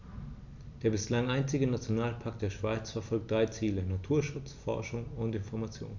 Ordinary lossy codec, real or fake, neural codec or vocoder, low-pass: none; real; none; 7.2 kHz